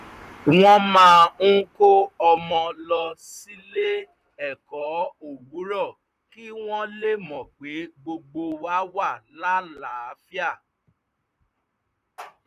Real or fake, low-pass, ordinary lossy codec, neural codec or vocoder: fake; 14.4 kHz; none; vocoder, 44.1 kHz, 128 mel bands, Pupu-Vocoder